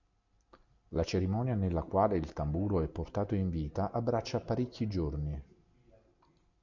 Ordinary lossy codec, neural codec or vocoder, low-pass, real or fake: MP3, 64 kbps; none; 7.2 kHz; real